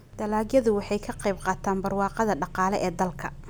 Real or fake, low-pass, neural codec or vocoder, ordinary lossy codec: real; none; none; none